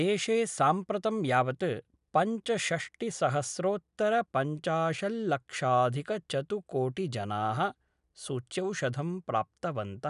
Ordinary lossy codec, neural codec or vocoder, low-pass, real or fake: none; none; 10.8 kHz; real